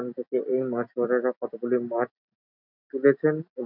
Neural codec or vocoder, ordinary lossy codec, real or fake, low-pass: none; none; real; 5.4 kHz